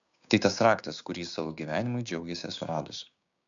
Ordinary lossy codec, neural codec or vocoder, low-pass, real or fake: AAC, 64 kbps; codec, 16 kHz, 6 kbps, DAC; 7.2 kHz; fake